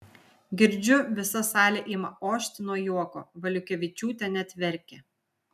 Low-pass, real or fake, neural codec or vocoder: 14.4 kHz; real; none